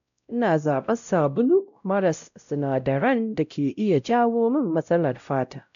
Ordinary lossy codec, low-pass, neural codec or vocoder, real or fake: MP3, 96 kbps; 7.2 kHz; codec, 16 kHz, 0.5 kbps, X-Codec, WavLM features, trained on Multilingual LibriSpeech; fake